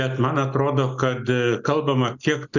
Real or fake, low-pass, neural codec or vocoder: real; 7.2 kHz; none